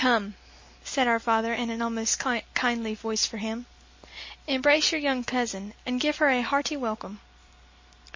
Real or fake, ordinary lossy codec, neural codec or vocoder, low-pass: real; MP3, 32 kbps; none; 7.2 kHz